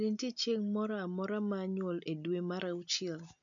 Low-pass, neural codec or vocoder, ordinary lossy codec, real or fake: 7.2 kHz; none; none; real